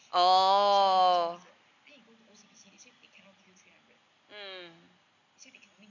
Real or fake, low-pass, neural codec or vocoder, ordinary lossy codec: real; 7.2 kHz; none; none